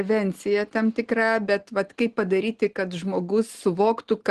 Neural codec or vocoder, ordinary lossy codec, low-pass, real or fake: none; Opus, 16 kbps; 10.8 kHz; real